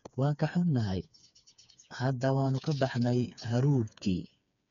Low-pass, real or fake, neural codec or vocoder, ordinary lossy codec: 7.2 kHz; fake; codec, 16 kHz, 4 kbps, FreqCodec, smaller model; none